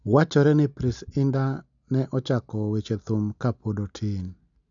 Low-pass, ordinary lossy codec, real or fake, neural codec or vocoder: 7.2 kHz; none; real; none